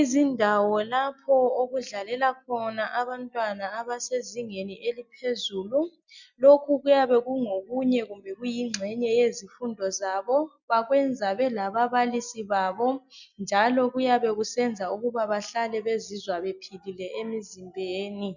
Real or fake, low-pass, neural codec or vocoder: real; 7.2 kHz; none